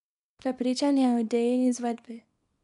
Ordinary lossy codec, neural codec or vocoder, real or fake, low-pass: none; codec, 24 kHz, 0.9 kbps, WavTokenizer, small release; fake; 10.8 kHz